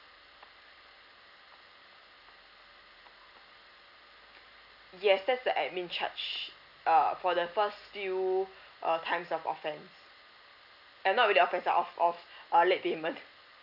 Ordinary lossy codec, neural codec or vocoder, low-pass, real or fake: AAC, 48 kbps; none; 5.4 kHz; real